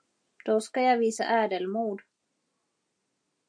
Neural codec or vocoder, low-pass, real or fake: none; 9.9 kHz; real